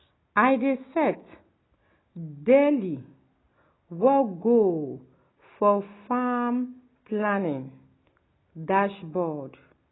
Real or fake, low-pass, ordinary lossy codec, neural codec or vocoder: real; 7.2 kHz; AAC, 16 kbps; none